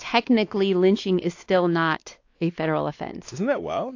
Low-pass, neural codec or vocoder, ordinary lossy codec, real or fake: 7.2 kHz; codec, 16 kHz, 2 kbps, X-Codec, WavLM features, trained on Multilingual LibriSpeech; AAC, 48 kbps; fake